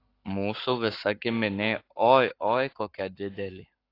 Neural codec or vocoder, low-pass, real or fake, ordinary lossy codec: codec, 44.1 kHz, 7.8 kbps, Pupu-Codec; 5.4 kHz; fake; AAC, 32 kbps